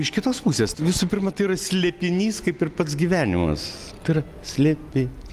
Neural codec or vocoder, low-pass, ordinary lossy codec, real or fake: none; 14.4 kHz; Opus, 32 kbps; real